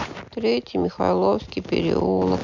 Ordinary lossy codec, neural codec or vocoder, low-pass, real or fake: none; none; 7.2 kHz; real